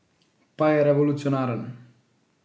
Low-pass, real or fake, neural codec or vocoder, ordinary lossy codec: none; real; none; none